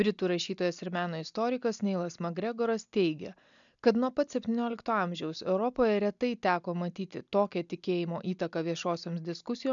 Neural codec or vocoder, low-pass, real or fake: none; 7.2 kHz; real